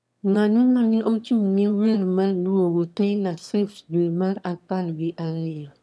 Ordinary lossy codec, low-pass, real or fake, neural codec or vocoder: none; none; fake; autoencoder, 22.05 kHz, a latent of 192 numbers a frame, VITS, trained on one speaker